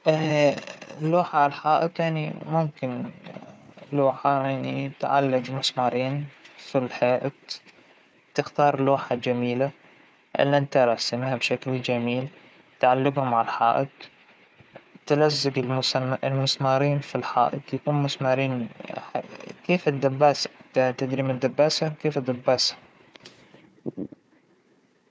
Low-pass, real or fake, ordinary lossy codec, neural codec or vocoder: none; fake; none; codec, 16 kHz, 4 kbps, FunCodec, trained on Chinese and English, 50 frames a second